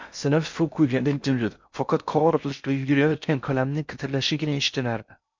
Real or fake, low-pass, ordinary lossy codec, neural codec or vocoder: fake; 7.2 kHz; MP3, 64 kbps; codec, 16 kHz in and 24 kHz out, 0.6 kbps, FocalCodec, streaming, 2048 codes